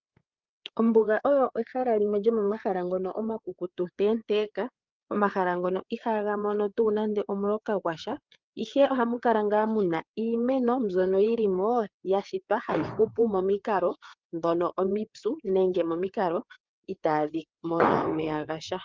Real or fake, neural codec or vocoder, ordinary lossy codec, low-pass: fake; codec, 16 kHz, 4 kbps, FreqCodec, larger model; Opus, 32 kbps; 7.2 kHz